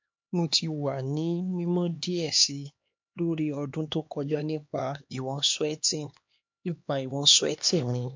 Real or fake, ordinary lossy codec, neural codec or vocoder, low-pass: fake; MP3, 48 kbps; codec, 16 kHz, 2 kbps, X-Codec, HuBERT features, trained on LibriSpeech; 7.2 kHz